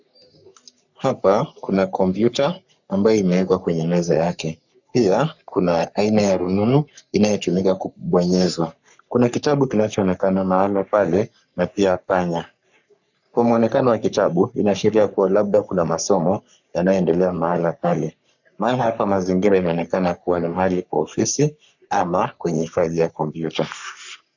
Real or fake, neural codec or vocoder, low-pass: fake; codec, 44.1 kHz, 3.4 kbps, Pupu-Codec; 7.2 kHz